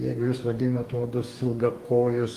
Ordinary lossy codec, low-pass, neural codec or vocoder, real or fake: Opus, 24 kbps; 14.4 kHz; codec, 44.1 kHz, 2.6 kbps, DAC; fake